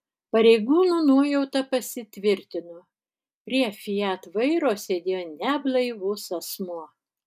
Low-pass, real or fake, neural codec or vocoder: 14.4 kHz; real; none